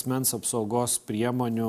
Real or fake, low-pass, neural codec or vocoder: real; 14.4 kHz; none